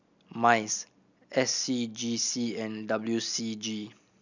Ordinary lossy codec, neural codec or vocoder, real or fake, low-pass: none; none; real; 7.2 kHz